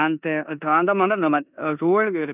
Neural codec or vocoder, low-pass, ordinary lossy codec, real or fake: codec, 16 kHz in and 24 kHz out, 0.9 kbps, LongCat-Audio-Codec, four codebook decoder; 3.6 kHz; none; fake